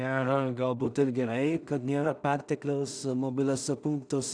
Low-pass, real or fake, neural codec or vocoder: 9.9 kHz; fake; codec, 16 kHz in and 24 kHz out, 0.4 kbps, LongCat-Audio-Codec, two codebook decoder